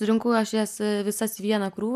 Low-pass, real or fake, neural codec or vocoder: 14.4 kHz; real; none